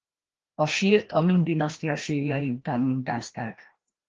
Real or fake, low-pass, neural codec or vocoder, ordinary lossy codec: fake; 7.2 kHz; codec, 16 kHz, 1 kbps, FreqCodec, larger model; Opus, 16 kbps